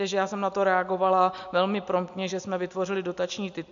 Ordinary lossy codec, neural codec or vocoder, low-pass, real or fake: AAC, 64 kbps; none; 7.2 kHz; real